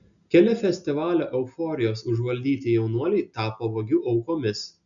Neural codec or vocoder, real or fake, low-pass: none; real; 7.2 kHz